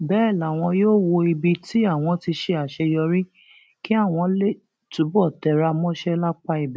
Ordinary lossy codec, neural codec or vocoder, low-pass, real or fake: none; none; none; real